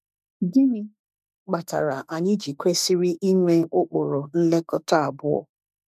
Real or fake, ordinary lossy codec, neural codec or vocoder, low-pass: fake; none; autoencoder, 48 kHz, 32 numbers a frame, DAC-VAE, trained on Japanese speech; 14.4 kHz